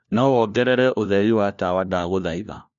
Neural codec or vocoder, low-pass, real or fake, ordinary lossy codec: codec, 16 kHz, 1 kbps, FunCodec, trained on LibriTTS, 50 frames a second; 7.2 kHz; fake; none